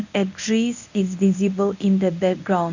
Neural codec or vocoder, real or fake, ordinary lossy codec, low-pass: codec, 24 kHz, 0.9 kbps, WavTokenizer, medium speech release version 1; fake; none; 7.2 kHz